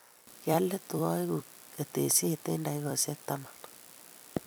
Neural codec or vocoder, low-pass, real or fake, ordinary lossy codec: vocoder, 44.1 kHz, 128 mel bands every 256 samples, BigVGAN v2; none; fake; none